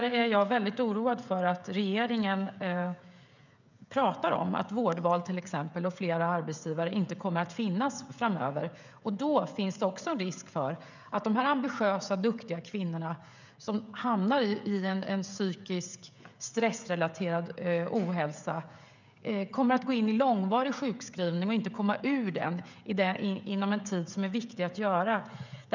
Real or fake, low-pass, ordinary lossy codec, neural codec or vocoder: fake; 7.2 kHz; none; codec, 16 kHz, 16 kbps, FreqCodec, smaller model